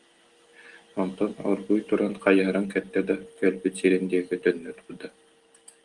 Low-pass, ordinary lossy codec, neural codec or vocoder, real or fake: 10.8 kHz; Opus, 24 kbps; none; real